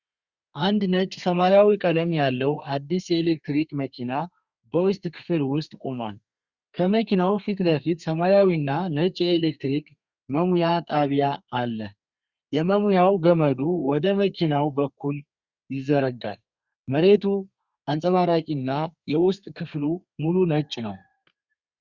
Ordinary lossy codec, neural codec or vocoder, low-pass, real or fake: Opus, 64 kbps; codec, 32 kHz, 1.9 kbps, SNAC; 7.2 kHz; fake